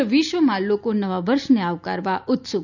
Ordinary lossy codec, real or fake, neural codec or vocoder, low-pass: none; real; none; 7.2 kHz